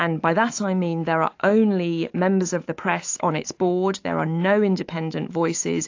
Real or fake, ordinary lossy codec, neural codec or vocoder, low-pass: fake; AAC, 48 kbps; autoencoder, 48 kHz, 128 numbers a frame, DAC-VAE, trained on Japanese speech; 7.2 kHz